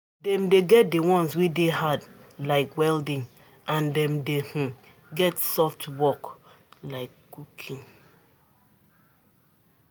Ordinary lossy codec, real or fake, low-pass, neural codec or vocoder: none; real; none; none